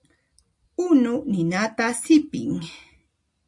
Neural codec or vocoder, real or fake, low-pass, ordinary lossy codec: none; real; 10.8 kHz; AAC, 64 kbps